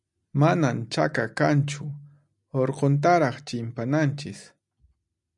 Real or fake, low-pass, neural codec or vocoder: real; 10.8 kHz; none